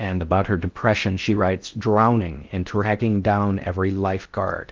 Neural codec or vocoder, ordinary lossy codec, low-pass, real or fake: codec, 16 kHz in and 24 kHz out, 0.6 kbps, FocalCodec, streaming, 4096 codes; Opus, 32 kbps; 7.2 kHz; fake